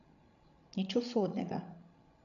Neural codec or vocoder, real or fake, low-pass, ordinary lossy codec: codec, 16 kHz, 16 kbps, FreqCodec, larger model; fake; 7.2 kHz; none